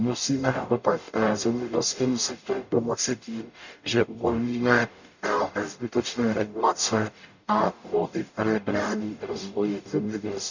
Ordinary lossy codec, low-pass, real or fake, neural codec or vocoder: none; 7.2 kHz; fake; codec, 44.1 kHz, 0.9 kbps, DAC